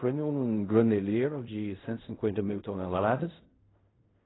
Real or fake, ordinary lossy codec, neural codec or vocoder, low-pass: fake; AAC, 16 kbps; codec, 16 kHz in and 24 kHz out, 0.4 kbps, LongCat-Audio-Codec, fine tuned four codebook decoder; 7.2 kHz